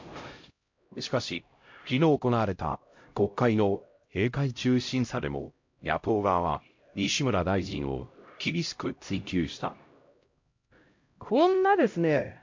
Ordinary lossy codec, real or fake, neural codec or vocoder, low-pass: MP3, 48 kbps; fake; codec, 16 kHz, 0.5 kbps, X-Codec, HuBERT features, trained on LibriSpeech; 7.2 kHz